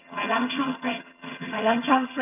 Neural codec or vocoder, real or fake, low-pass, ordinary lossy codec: vocoder, 22.05 kHz, 80 mel bands, HiFi-GAN; fake; 3.6 kHz; none